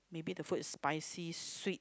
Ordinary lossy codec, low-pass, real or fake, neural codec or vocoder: none; none; real; none